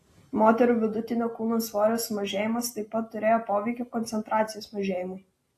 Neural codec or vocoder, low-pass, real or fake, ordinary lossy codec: none; 14.4 kHz; real; AAC, 48 kbps